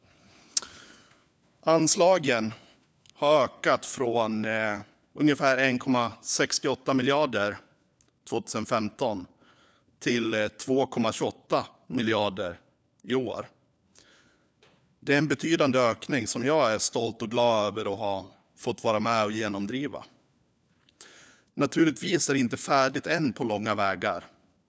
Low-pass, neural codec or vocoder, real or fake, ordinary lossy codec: none; codec, 16 kHz, 4 kbps, FunCodec, trained on LibriTTS, 50 frames a second; fake; none